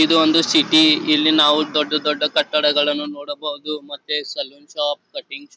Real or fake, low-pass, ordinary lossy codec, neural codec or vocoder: real; none; none; none